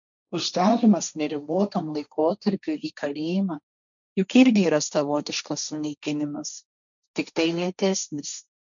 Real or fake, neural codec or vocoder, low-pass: fake; codec, 16 kHz, 1.1 kbps, Voila-Tokenizer; 7.2 kHz